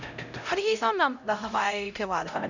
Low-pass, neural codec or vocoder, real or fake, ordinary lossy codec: 7.2 kHz; codec, 16 kHz, 0.5 kbps, X-Codec, HuBERT features, trained on LibriSpeech; fake; none